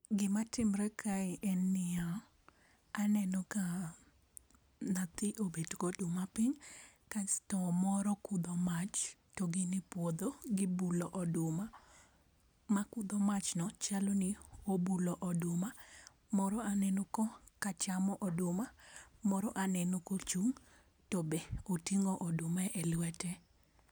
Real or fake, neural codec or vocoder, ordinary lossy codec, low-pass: real; none; none; none